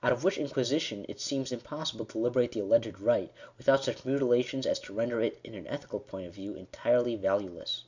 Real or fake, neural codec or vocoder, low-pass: real; none; 7.2 kHz